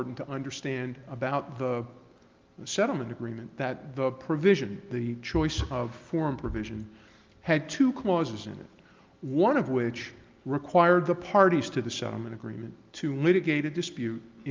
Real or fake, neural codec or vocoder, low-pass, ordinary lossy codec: real; none; 7.2 kHz; Opus, 32 kbps